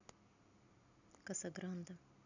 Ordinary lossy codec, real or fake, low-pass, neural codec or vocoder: none; real; 7.2 kHz; none